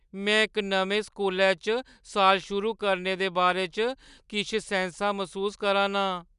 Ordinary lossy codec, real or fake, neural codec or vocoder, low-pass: none; real; none; 10.8 kHz